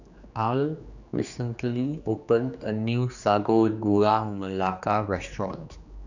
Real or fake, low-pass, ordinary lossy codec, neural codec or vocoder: fake; 7.2 kHz; none; codec, 16 kHz, 2 kbps, X-Codec, HuBERT features, trained on general audio